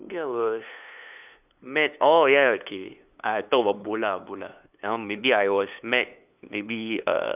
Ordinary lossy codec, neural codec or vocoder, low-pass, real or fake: none; codec, 16 kHz, 2 kbps, FunCodec, trained on LibriTTS, 25 frames a second; 3.6 kHz; fake